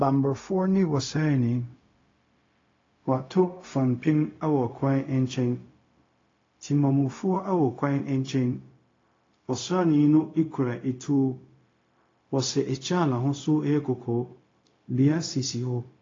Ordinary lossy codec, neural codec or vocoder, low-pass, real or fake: AAC, 32 kbps; codec, 16 kHz, 0.4 kbps, LongCat-Audio-Codec; 7.2 kHz; fake